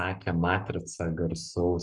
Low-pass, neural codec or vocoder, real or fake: 10.8 kHz; codec, 44.1 kHz, 7.8 kbps, Pupu-Codec; fake